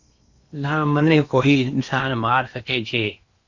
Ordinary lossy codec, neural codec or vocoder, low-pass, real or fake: Opus, 64 kbps; codec, 16 kHz in and 24 kHz out, 0.8 kbps, FocalCodec, streaming, 65536 codes; 7.2 kHz; fake